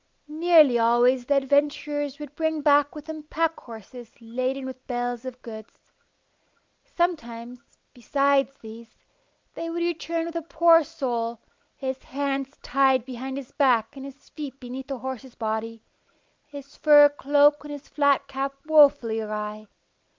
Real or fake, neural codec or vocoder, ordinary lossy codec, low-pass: real; none; Opus, 24 kbps; 7.2 kHz